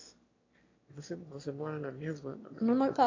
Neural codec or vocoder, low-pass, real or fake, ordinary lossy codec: autoencoder, 22.05 kHz, a latent of 192 numbers a frame, VITS, trained on one speaker; 7.2 kHz; fake; AAC, 32 kbps